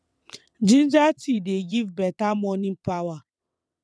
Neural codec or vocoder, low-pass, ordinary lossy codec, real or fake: vocoder, 22.05 kHz, 80 mel bands, WaveNeXt; none; none; fake